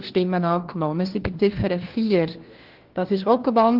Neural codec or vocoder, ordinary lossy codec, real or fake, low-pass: codec, 16 kHz, 1 kbps, FunCodec, trained on LibriTTS, 50 frames a second; Opus, 16 kbps; fake; 5.4 kHz